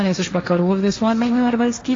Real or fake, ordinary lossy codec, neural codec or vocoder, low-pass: fake; AAC, 32 kbps; codec, 16 kHz, 1.1 kbps, Voila-Tokenizer; 7.2 kHz